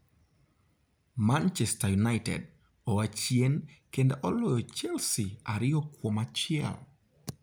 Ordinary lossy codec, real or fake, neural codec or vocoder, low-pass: none; real; none; none